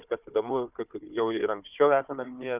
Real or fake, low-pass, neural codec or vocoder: fake; 3.6 kHz; codec, 16 kHz, 4 kbps, FunCodec, trained on Chinese and English, 50 frames a second